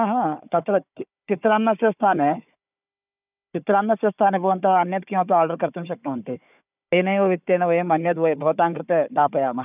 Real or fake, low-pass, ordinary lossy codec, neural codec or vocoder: fake; 3.6 kHz; none; codec, 16 kHz, 4 kbps, FunCodec, trained on Chinese and English, 50 frames a second